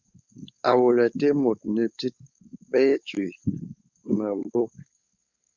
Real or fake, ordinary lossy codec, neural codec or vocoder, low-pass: fake; Opus, 64 kbps; codec, 16 kHz, 4.8 kbps, FACodec; 7.2 kHz